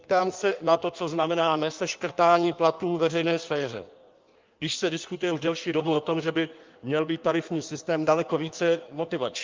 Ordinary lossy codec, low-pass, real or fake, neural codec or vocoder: Opus, 24 kbps; 7.2 kHz; fake; codec, 16 kHz in and 24 kHz out, 1.1 kbps, FireRedTTS-2 codec